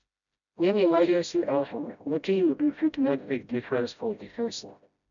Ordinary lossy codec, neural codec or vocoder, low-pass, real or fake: none; codec, 16 kHz, 0.5 kbps, FreqCodec, smaller model; 7.2 kHz; fake